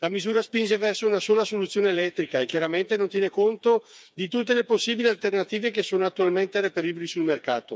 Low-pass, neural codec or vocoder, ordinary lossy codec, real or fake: none; codec, 16 kHz, 4 kbps, FreqCodec, smaller model; none; fake